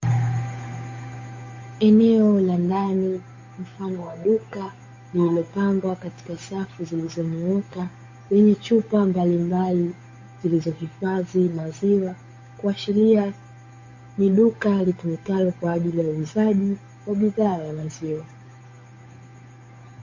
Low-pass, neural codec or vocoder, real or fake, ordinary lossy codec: 7.2 kHz; codec, 16 kHz, 8 kbps, FunCodec, trained on Chinese and English, 25 frames a second; fake; MP3, 32 kbps